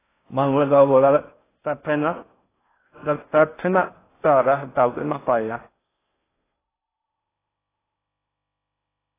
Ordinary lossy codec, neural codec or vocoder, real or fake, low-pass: AAC, 16 kbps; codec, 16 kHz in and 24 kHz out, 0.6 kbps, FocalCodec, streaming, 4096 codes; fake; 3.6 kHz